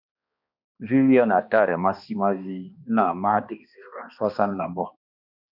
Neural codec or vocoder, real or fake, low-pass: codec, 16 kHz, 2 kbps, X-Codec, HuBERT features, trained on balanced general audio; fake; 5.4 kHz